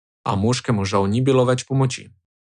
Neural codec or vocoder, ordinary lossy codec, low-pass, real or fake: none; none; 9.9 kHz; real